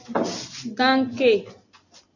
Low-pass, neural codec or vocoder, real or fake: 7.2 kHz; none; real